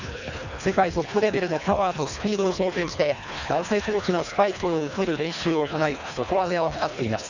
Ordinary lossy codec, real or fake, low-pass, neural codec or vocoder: none; fake; 7.2 kHz; codec, 24 kHz, 1.5 kbps, HILCodec